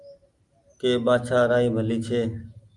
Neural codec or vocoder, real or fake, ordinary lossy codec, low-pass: none; real; Opus, 32 kbps; 10.8 kHz